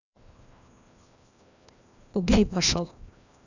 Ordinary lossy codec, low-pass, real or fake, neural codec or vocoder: none; 7.2 kHz; fake; codec, 16 kHz, 1 kbps, FreqCodec, larger model